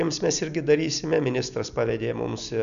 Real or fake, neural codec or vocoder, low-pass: real; none; 7.2 kHz